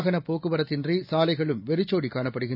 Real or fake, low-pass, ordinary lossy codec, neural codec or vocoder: real; 5.4 kHz; none; none